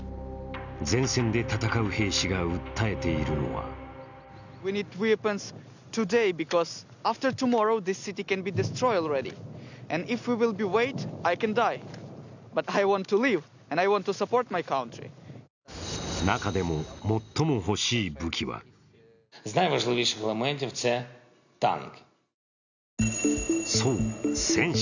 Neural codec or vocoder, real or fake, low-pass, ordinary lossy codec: none; real; 7.2 kHz; none